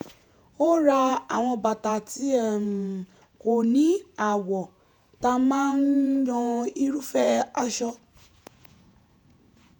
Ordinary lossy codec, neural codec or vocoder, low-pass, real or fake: none; vocoder, 48 kHz, 128 mel bands, Vocos; 19.8 kHz; fake